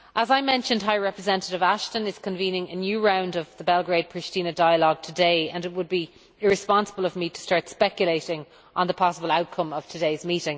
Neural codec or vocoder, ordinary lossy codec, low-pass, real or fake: none; none; none; real